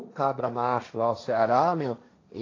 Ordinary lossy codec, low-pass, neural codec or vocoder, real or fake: AAC, 32 kbps; 7.2 kHz; codec, 16 kHz, 1.1 kbps, Voila-Tokenizer; fake